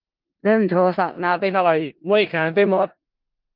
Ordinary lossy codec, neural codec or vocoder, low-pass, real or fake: Opus, 24 kbps; codec, 16 kHz in and 24 kHz out, 0.4 kbps, LongCat-Audio-Codec, four codebook decoder; 5.4 kHz; fake